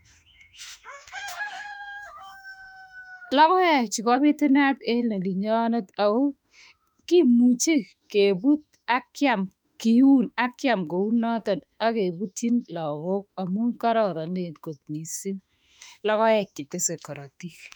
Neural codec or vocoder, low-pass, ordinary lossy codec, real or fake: autoencoder, 48 kHz, 32 numbers a frame, DAC-VAE, trained on Japanese speech; 19.8 kHz; none; fake